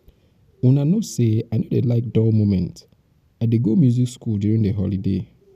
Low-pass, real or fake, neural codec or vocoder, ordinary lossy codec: 14.4 kHz; real; none; none